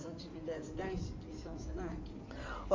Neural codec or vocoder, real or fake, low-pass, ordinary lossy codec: codec, 16 kHz in and 24 kHz out, 2.2 kbps, FireRedTTS-2 codec; fake; 7.2 kHz; MP3, 48 kbps